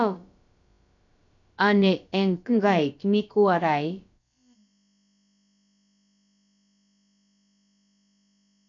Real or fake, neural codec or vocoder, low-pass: fake; codec, 16 kHz, about 1 kbps, DyCAST, with the encoder's durations; 7.2 kHz